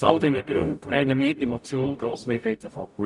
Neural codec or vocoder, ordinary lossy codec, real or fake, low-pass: codec, 44.1 kHz, 0.9 kbps, DAC; none; fake; 10.8 kHz